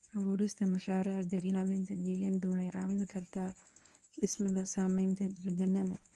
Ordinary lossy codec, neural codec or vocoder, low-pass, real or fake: Opus, 32 kbps; codec, 24 kHz, 0.9 kbps, WavTokenizer, medium speech release version 1; 10.8 kHz; fake